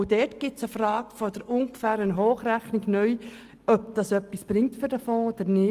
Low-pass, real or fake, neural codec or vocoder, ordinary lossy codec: 14.4 kHz; real; none; Opus, 24 kbps